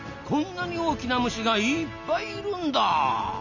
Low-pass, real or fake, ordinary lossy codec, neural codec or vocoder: 7.2 kHz; real; none; none